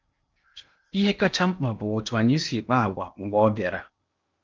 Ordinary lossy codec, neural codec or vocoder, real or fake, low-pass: Opus, 24 kbps; codec, 16 kHz in and 24 kHz out, 0.8 kbps, FocalCodec, streaming, 65536 codes; fake; 7.2 kHz